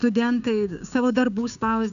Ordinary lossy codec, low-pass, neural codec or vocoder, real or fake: MP3, 96 kbps; 7.2 kHz; codec, 16 kHz, 6 kbps, DAC; fake